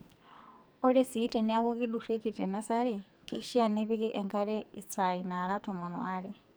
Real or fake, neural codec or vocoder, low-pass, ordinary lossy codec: fake; codec, 44.1 kHz, 2.6 kbps, SNAC; none; none